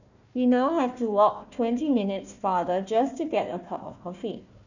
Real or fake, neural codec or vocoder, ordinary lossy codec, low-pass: fake; codec, 16 kHz, 1 kbps, FunCodec, trained on Chinese and English, 50 frames a second; none; 7.2 kHz